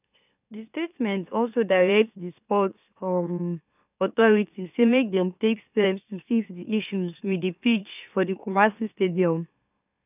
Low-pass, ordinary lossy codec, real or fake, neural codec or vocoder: 3.6 kHz; none; fake; autoencoder, 44.1 kHz, a latent of 192 numbers a frame, MeloTTS